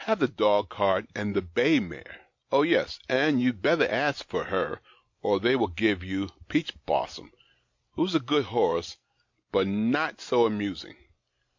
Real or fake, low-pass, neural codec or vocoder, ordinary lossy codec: real; 7.2 kHz; none; MP3, 48 kbps